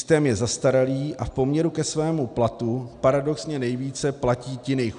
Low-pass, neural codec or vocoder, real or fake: 9.9 kHz; none; real